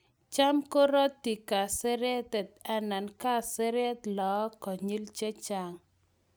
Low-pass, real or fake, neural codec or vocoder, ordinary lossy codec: none; real; none; none